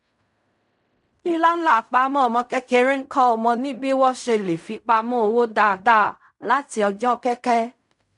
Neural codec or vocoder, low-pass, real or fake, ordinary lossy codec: codec, 16 kHz in and 24 kHz out, 0.4 kbps, LongCat-Audio-Codec, fine tuned four codebook decoder; 10.8 kHz; fake; none